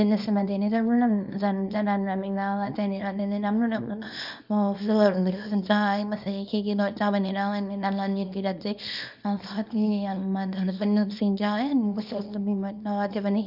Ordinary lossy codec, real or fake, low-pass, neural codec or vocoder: none; fake; 5.4 kHz; codec, 24 kHz, 0.9 kbps, WavTokenizer, small release